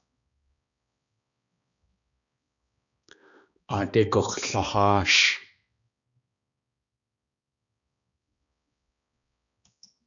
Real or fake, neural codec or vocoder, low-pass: fake; codec, 16 kHz, 2 kbps, X-Codec, HuBERT features, trained on balanced general audio; 7.2 kHz